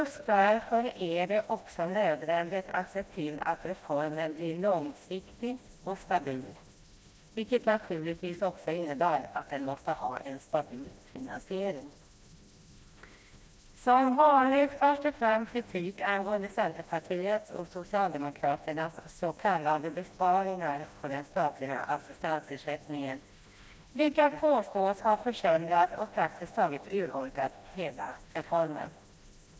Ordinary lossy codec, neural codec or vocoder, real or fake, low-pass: none; codec, 16 kHz, 1 kbps, FreqCodec, smaller model; fake; none